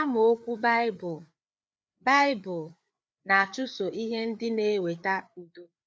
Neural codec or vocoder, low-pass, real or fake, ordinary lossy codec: codec, 16 kHz, 4 kbps, FreqCodec, larger model; none; fake; none